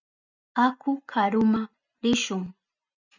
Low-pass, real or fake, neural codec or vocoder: 7.2 kHz; real; none